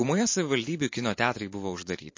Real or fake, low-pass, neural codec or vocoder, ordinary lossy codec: fake; 7.2 kHz; vocoder, 44.1 kHz, 128 mel bands every 512 samples, BigVGAN v2; MP3, 32 kbps